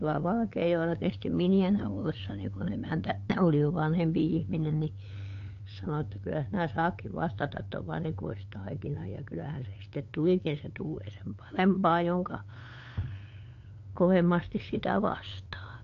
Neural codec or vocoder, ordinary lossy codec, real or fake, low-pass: codec, 16 kHz, 8 kbps, FunCodec, trained on LibriTTS, 25 frames a second; AAC, 48 kbps; fake; 7.2 kHz